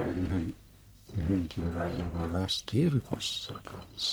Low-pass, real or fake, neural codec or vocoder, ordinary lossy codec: none; fake; codec, 44.1 kHz, 1.7 kbps, Pupu-Codec; none